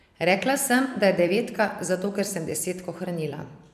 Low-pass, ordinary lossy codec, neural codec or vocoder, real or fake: 14.4 kHz; none; vocoder, 44.1 kHz, 128 mel bands every 256 samples, BigVGAN v2; fake